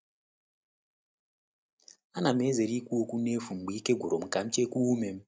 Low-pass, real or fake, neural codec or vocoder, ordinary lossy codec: none; real; none; none